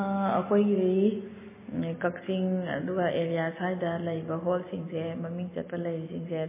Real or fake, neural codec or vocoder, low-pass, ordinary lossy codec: real; none; 3.6 kHz; MP3, 16 kbps